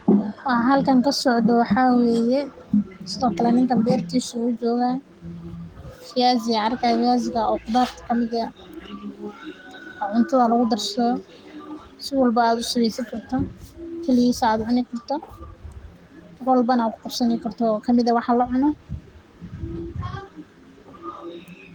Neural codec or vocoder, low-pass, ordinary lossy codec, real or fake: codec, 44.1 kHz, 7.8 kbps, Pupu-Codec; 19.8 kHz; Opus, 24 kbps; fake